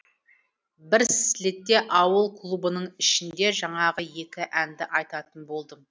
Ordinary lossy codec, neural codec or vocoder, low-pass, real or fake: none; none; none; real